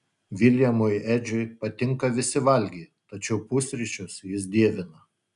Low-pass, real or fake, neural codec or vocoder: 10.8 kHz; real; none